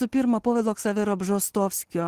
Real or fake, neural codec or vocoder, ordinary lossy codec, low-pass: real; none; Opus, 16 kbps; 14.4 kHz